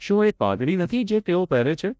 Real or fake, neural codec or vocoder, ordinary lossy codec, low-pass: fake; codec, 16 kHz, 0.5 kbps, FreqCodec, larger model; none; none